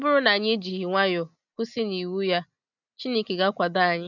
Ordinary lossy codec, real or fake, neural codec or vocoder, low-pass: none; real; none; 7.2 kHz